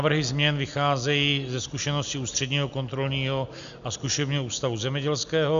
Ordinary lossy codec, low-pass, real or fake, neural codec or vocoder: AAC, 96 kbps; 7.2 kHz; real; none